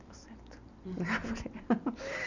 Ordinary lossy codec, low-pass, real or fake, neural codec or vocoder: none; 7.2 kHz; real; none